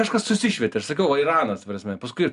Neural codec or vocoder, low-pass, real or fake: none; 10.8 kHz; real